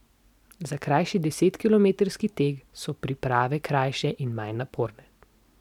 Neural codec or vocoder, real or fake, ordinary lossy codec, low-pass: none; real; none; 19.8 kHz